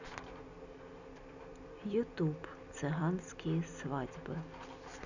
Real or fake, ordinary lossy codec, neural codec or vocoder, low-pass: real; none; none; 7.2 kHz